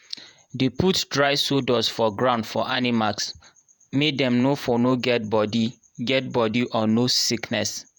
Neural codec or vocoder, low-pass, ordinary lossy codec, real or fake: vocoder, 48 kHz, 128 mel bands, Vocos; none; none; fake